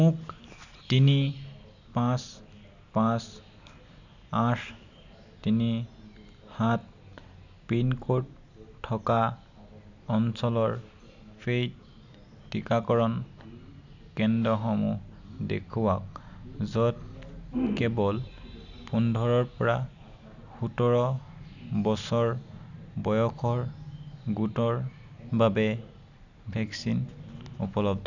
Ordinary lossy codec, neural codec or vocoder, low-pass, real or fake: none; none; 7.2 kHz; real